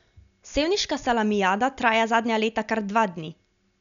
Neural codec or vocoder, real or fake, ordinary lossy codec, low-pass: none; real; none; 7.2 kHz